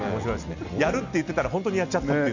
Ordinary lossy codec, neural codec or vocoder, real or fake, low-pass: none; none; real; 7.2 kHz